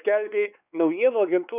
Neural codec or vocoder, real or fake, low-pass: codec, 16 kHz, 4 kbps, X-Codec, WavLM features, trained on Multilingual LibriSpeech; fake; 3.6 kHz